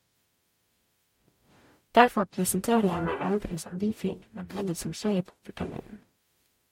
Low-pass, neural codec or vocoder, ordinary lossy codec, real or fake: 19.8 kHz; codec, 44.1 kHz, 0.9 kbps, DAC; MP3, 64 kbps; fake